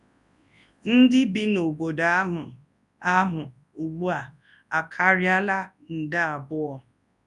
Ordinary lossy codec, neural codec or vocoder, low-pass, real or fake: none; codec, 24 kHz, 0.9 kbps, WavTokenizer, large speech release; 10.8 kHz; fake